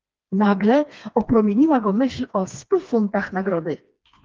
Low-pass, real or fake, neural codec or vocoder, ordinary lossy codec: 7.2 kHz; fake; codec, 16 kHz, 2 kbps, FreqCodec, smaller model; Opus, 24 kbps